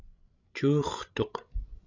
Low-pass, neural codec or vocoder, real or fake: 7.2 kHz; codec, 16 kHz, 16 kbps, FreqCodec, larger model; fake